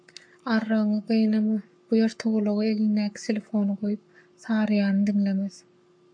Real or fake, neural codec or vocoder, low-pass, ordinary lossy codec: fake; codec, 44.1 kHz, 7.8 kbps, Pupu-Codec; 9.9 kHz; MP3, 64 kbps